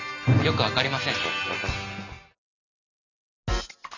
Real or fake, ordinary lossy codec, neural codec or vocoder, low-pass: real; none; none; 7.2 kHz